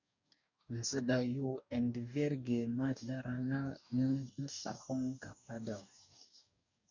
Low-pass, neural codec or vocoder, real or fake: 7.2 kHz; codec, 44.1 kHz, 2.6 kbps, DAC; fake